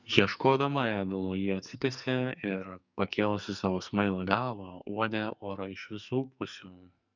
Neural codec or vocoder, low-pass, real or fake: codec, 44.1 kHz, 2.6 kbps, SNAC; 7.2 kHz; fake